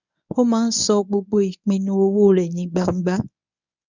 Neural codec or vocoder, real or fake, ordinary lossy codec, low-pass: codec, 24 kHz, 0.9 kbps, WavTokenizer, medium speech release version 1; fake; none; 7.2 kHz